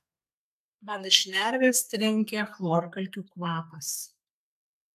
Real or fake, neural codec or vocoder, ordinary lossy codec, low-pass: fake; codec, 32 kHz, 1.9 kbps, SNAC; AAC, 96 kbps; 14.4 kHz